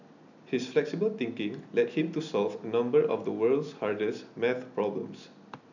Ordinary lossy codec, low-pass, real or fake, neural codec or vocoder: none; 7.2 kHz; real; none